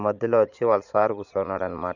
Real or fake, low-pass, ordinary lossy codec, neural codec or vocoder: fake; 7.2 kHz; none; vocoder, 22.05 kHz, 80 mel bands, Vocos